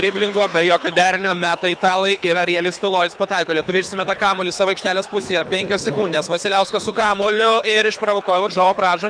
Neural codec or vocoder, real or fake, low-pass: codec, 24 kHz, 3 kbps, HILCodec; fake; 9.9 kHz